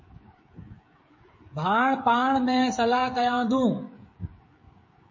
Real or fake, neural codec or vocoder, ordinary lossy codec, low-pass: fake; codec, 16 kHz, 16 kbps, FreqCodec, smaller model; MP3, 32 kbps; 7.2 kHz